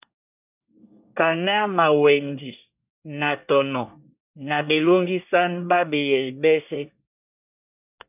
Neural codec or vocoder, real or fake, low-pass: codec, 24 kHz, 1 kbps, SNAC; fake; 3.6 kHz